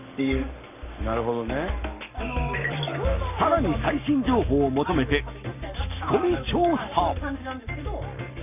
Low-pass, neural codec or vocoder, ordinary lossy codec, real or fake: 3.6 kHz; codec, 44.1 kHz, 7.8 kbps, Pupu-Codec; none; fake